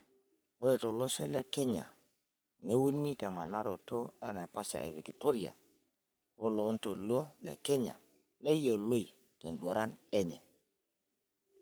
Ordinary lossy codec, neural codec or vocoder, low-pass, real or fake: none; codec, 44.1 kHz, 3.4 kbps, Pupu-Codec; none; fake